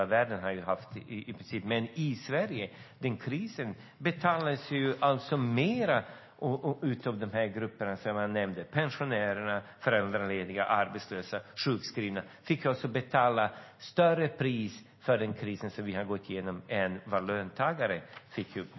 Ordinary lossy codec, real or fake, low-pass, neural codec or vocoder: MP3, 24 kbps; real; 7.2 kHz; none